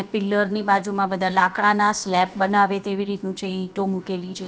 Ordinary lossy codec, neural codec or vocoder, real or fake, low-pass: none; codec, 16 kHz, about 1 kbps, DyCAST, with the encoder's durations; fake; none